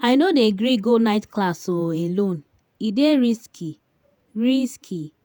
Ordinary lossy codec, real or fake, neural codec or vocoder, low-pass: none; fake; vocoder, 48 kHz, 128 mel bands, Vocos; none